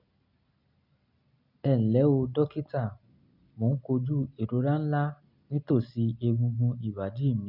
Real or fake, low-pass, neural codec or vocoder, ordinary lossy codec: real; 5.4 kHz; none; none